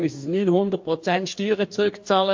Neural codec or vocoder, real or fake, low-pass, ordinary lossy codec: codec, 44.1 kHz, 2.6 kbps, DAC; fake; 7.2 kHz; MP3, 48 kbps